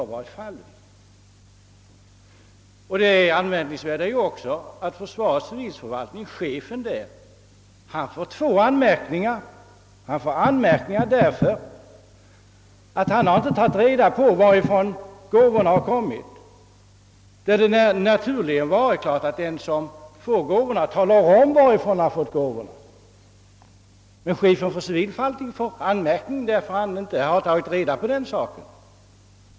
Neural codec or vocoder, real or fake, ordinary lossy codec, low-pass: none; real; none; none